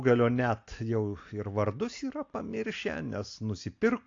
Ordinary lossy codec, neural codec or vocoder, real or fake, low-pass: AAC, 48 kbps; none; real; 7.2 kHz